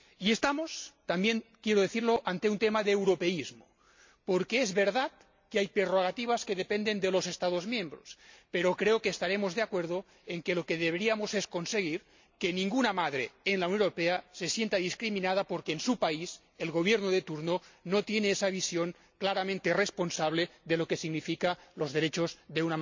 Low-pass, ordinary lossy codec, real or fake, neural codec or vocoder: 7.2 kHz; MP3, 64 kbps; real; none